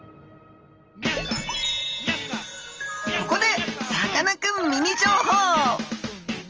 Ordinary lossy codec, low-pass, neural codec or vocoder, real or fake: Opus, 24 kbps; 7.2 kHz; none; real